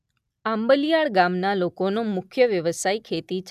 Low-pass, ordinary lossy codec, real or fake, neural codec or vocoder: 14.4 kHz; none; real; none